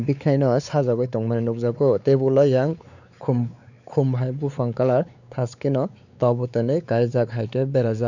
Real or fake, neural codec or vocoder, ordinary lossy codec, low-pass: fake; codec, 16 kHz, 4 kbps, X-Codec, WavLM features, trained on Multilingual LibriSpeech; none; 7.2 kHz